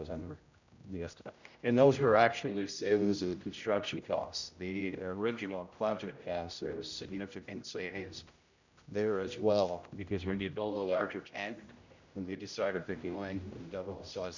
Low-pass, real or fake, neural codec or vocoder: 7.2 kHz; fake; codec, 16 kHz, 0.5 kbps, X-Codec, HuBERT features, trained on general audio